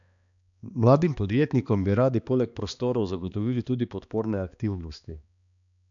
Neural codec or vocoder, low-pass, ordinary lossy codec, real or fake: codec, 16 kHz, 2 kbps, X-Codec, HuBERT features, trained on balanced general audio; 7.2 kHz; none; fake